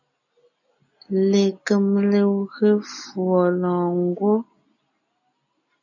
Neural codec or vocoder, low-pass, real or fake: none; 7.2 kHz; real